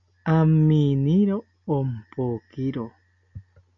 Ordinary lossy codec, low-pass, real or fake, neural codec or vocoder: MP3, 96 kbps; 7.2 kHz; real; none